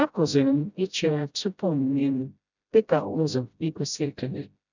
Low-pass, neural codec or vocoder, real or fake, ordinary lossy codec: 7.2 kHz; codec, 16 kHz, 0.5 kbps, FreqCodec, smaller model; fake; none